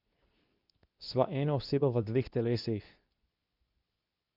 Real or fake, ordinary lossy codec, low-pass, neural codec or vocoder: fake; none; 5.4 kHz; codec, 24 kHz, 0.9 kbps, WavTokenizer, medium speech release version 2